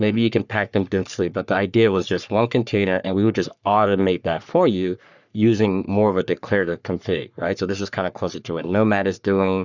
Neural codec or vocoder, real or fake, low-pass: codec, 44.1 kHz, 3.4 kbps, Pupu-Codec; fake; 7.2 kHz